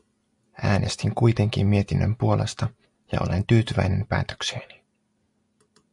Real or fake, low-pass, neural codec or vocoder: real; 10.8 kHz; none